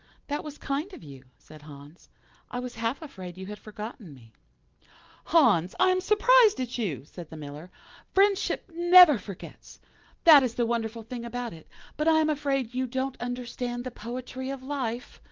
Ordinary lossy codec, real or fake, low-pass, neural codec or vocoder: Opus, 32 kbps; real; 7.2 kHz; none